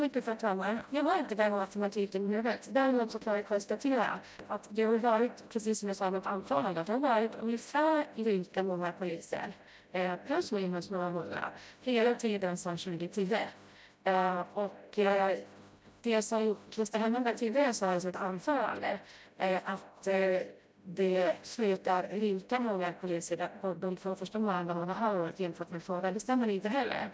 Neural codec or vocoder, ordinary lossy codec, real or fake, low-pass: codec, 16 kHz, 0.5 kbps, FreqCodec, smaller model; none; fake; none